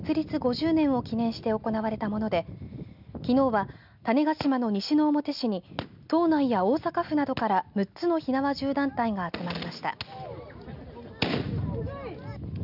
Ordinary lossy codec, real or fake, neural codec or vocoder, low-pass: none; real; none; 5.4 kHz